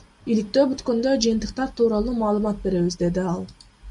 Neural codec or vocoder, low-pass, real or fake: none; 10.8 kHz; real